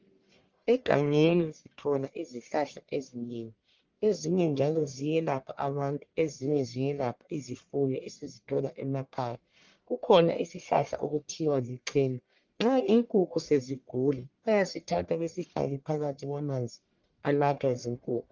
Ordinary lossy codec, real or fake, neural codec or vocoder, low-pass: Opus, 32 kbps; fake; codec, 44.1 kHz, 1.7 kbps, Pupu-Codec; 7.2 kHz